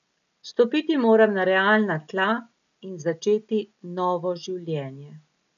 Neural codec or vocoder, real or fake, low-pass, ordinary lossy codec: none; real; 7.2 kHz; none